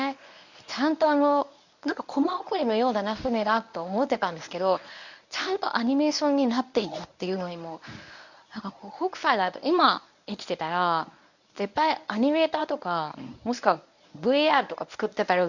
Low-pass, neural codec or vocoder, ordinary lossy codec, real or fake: 7.2 kHz; codec, 24 kHz, 0.9 kbps, WavTokenizer, medium speech release version 1; none; fake